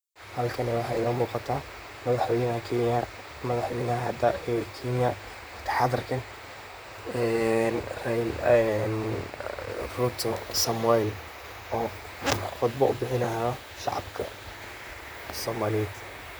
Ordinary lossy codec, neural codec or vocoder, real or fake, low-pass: none; vocoder, 44.1 kHz, 128 mel bands, Pupu-Vocoder; fake; none